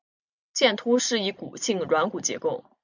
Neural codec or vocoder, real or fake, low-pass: none; real; 7.2 kHz